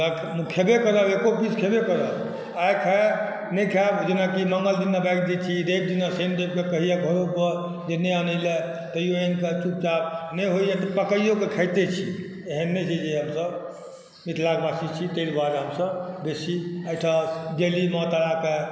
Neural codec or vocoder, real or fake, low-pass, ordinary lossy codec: none; real; none; none